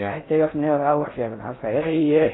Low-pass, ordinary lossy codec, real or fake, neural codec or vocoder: 7.2 kHz; AAC, 16 kbps; fake; codec, 16 kHz in and 24 kHz out, 0.6 kbps, FocalCodec, streaming, 4096 codes